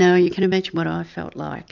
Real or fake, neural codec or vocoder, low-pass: real; none; 7.2 kHz